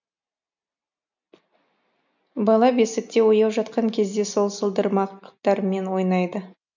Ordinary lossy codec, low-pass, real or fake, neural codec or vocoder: none; 7.2 kHz; real; none